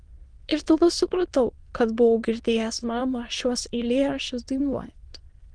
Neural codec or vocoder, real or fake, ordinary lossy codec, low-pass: autoencoder, 22.05 kHz, a latent of 192 numbers a frame, VITS, trained on many speakers; fake; Opus, 24 kbps; 9.9 kHz